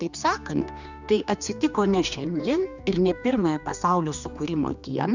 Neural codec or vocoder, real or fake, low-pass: codec, 16 kHz, 2 kbps, X-Codec, HuBERT features, trained on general audio; fake; 7.2 kHz